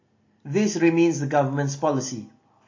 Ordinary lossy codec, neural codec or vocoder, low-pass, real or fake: MP3, 32 kbps; none; 7.2 kHz; real